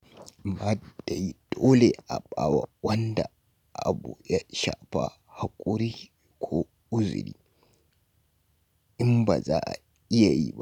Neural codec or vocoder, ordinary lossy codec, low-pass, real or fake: none; none; 19.8 kHz; real